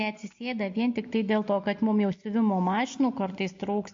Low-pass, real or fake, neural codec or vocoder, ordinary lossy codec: 7.2 kHz; real; none; MP3, 48 kbps